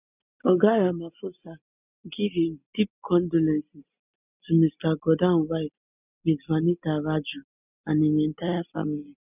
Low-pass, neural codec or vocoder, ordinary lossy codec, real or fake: 3.6 kHz; none; none; real